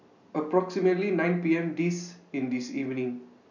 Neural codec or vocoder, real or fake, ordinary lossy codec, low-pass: none; real; none; 7.2 kHz